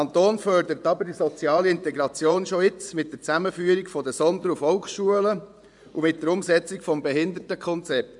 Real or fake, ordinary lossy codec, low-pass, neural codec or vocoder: fake; none; 10.8 kHz; vocoder, 24 kHz, 100 mel bands, Vocos